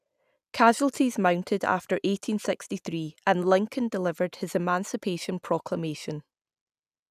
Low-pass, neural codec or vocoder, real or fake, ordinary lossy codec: 14.4 kHz; none; real; none